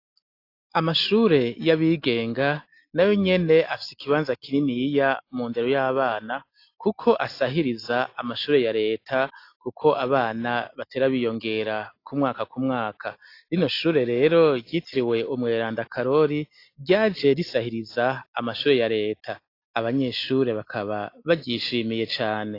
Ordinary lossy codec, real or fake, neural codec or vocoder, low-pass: AAC, 32 kbps; real; none; 5.4 kHz